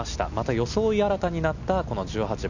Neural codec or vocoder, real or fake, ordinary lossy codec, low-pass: none; real; none; 7.2 kHz